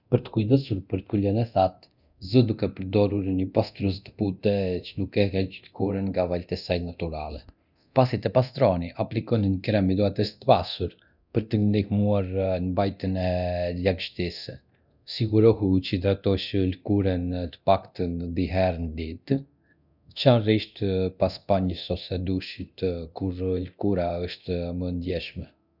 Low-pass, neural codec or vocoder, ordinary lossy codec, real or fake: 5.4 kHz; codec, 24 kHz, 0.9 kbps, DualCodec; none; fake